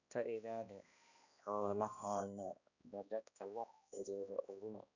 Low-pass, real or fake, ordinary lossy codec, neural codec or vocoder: 7.2 kHz; fake; none; codec, 16 kHz, 1 kbps, X-Codec, HuBERT features, trained on balanced general audio